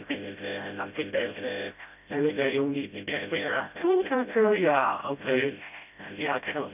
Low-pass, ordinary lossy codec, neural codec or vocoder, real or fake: 3.6 kHz; none; codec, 16 kHz, 0.5 kbps, FreqCodec, smaller model; fake